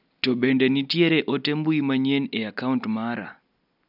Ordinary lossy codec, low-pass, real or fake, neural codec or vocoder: none; 5.4 kHz; real; none